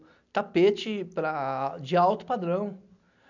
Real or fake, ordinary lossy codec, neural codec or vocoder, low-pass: real; none; none; 7.2 kHz